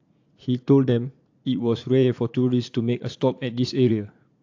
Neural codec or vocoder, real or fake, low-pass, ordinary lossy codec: vocoder, 44.1 kHz, 80 mel bands, Vocos; fake; 7.2 kHz; AAC, 48 kbps